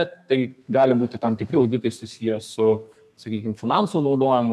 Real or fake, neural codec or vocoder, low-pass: fake; codec, 32 kHz, 1.9 kbps, SNAC; 14.4 kHz